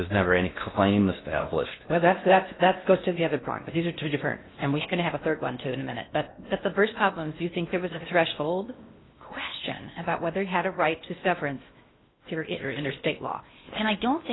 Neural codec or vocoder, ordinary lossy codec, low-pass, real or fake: codec, 16 kHz in and 24 kHz out, 0.6 kbps, FocalCodec, streaming, 4096 codes; AAC, 16 kbps; 7.2 kHz; fake